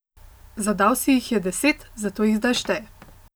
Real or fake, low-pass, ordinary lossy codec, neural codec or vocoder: real; none; none; none